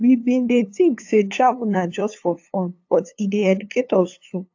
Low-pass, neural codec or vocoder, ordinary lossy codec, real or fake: 7.2 kHz; codec, 16 kHz, 2 kbps, FunCodec, trained on LibriTTS, 25 frames a second; AAC, 48 kbps; fake